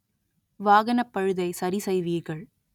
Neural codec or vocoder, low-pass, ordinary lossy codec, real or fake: none; 19.8 kHz; none; real